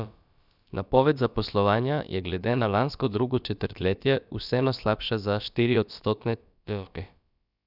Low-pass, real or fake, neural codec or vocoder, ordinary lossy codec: 5.4 kHz; fake; codec, 16 kHz, about 1 kbps, DyCAST, with the encoder's durations; none